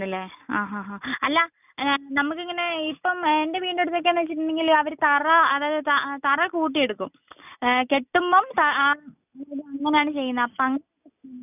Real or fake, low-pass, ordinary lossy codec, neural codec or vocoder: real; 3.6 kHz; none; none